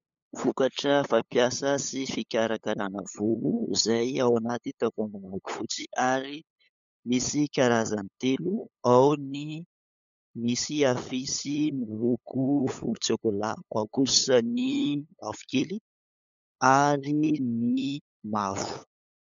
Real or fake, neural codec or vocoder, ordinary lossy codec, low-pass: fake; codec, 16 kHz, 8 kbps, FunCodec, trained on LibriTTS, 25 frames a second; MP3, 64 kbps; 7.2 kHz